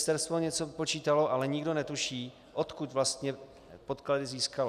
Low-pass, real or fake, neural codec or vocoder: 14.4 kHz; real; none